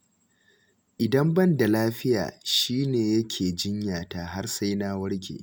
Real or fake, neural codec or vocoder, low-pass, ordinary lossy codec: real; none; none; none